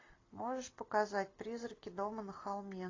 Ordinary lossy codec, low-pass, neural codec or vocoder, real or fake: AAC, 32 kbps; 7.2 kHz; none; real